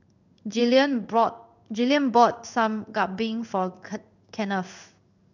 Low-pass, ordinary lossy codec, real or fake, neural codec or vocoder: 7.2 kHz; none; fake; codec, 16 kHz in and 24 kHz out, 1 kbps, XY-Tokenizer